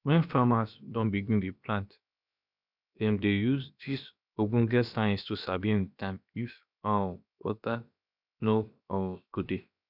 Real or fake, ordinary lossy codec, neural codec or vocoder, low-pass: fake; none; codec, 16 kHz, about 1 kbps, DyCAST, with the encoder's durations; 5.4 kHz